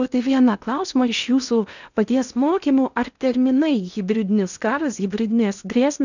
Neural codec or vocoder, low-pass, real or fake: codec, 16 kHz in and 24 kHz out, 0.8 kbps, FocalCodec, streaming, 65536 codes; 7.2 kHz; fake